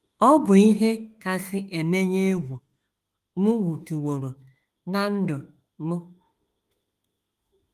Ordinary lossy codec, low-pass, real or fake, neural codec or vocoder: Opus, 24 kbps; 14.4 kHz; fake; autoencoder, 48 kHz, 32 numbers a frame, DAC-VAE, trained on Japanese speech